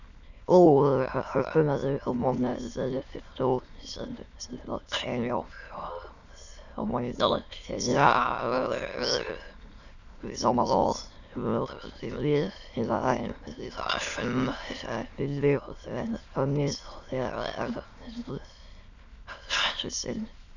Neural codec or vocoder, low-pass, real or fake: autoencoder, 22.05 kHz, a latent of 192 numbers a frame, VITS, trained on many speakers; 7.2 kHz; fake